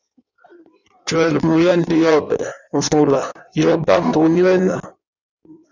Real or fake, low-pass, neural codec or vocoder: fake; 7.2 kHz; codec, 16 kHz in and 24 kHz out, 1.1 kbps, FireRedTTS-2 codec